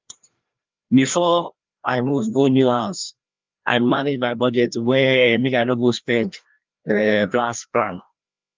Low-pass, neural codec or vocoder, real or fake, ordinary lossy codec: 7.2 kHz; codec, 16 kHz, 1 kbps, FreqCodec, larger model; fake; Opus, 24 kbps